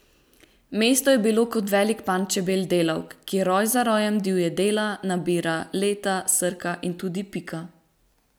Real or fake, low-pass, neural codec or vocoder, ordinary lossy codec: real; none; none; none